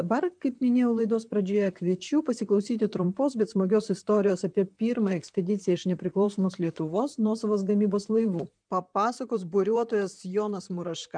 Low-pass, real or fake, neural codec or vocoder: 9.9 kHz; fake; vocoder, 44.1 kHz, 128 mel bands, Pupu-Vocoder